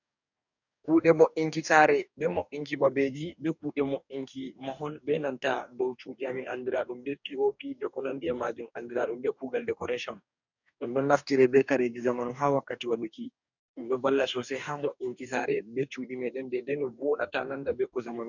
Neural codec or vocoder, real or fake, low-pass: codec, 44.1 kHz, 2.6 kbps, DAC; fake; 7.2 kHz